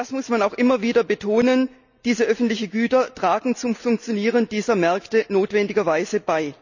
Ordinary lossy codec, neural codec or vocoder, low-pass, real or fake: none; none; 7.2 kHz; real